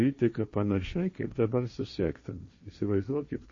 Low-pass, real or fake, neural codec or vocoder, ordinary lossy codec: 7.2 kHz; fake; codec, 16 kHz, 1.1 kbps, Voila-Tokenizer; MP3, 32 kbps